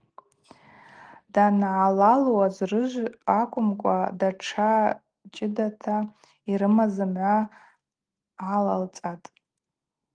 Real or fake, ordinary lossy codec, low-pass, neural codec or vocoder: real; Opus, 24 kbps; 9.9 kHz; none